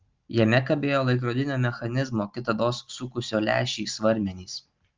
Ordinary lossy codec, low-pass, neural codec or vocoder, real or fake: Opus, 24 kbps; 7.2 kHz; none; real